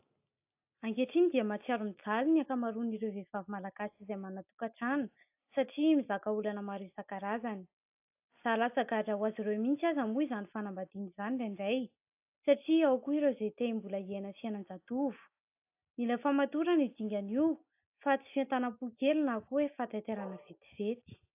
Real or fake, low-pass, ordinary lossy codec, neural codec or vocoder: real; 3.6 kHz; AAC, 32 kbps; none